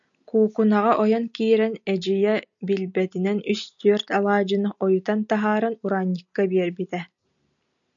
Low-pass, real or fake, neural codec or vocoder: 7.2 kHz; real; none